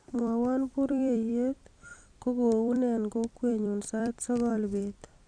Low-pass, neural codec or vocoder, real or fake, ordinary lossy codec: 9.9 kHz; vocoder, 44.1 kHz, 128 mel bands every 256 samples, BigVGAN v2; fake; Opus, 64 kbps